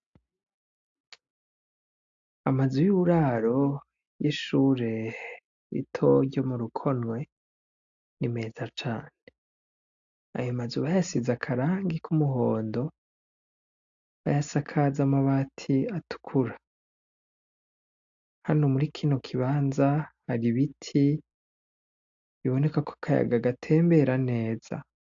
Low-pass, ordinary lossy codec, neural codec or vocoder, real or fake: 7.2 kHz; AAC, 64 kbps; none; real